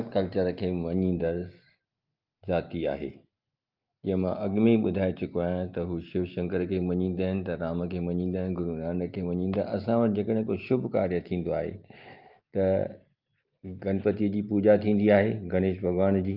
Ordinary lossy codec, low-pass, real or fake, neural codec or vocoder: Opus, 32 kbps; 5.4 kHz; real; none